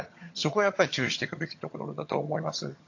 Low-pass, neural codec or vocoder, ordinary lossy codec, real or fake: 7.2 kHz; vocoder, 22.05 kHz, 80 mel bands, HiFi-GAN; AAC, 48 kbps; fake